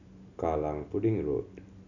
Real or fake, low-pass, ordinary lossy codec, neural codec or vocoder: real; 7.2 kHz; none; none